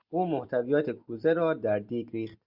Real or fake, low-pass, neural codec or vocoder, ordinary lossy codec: real; 5.4 kHz; none; Opus, 64 kbps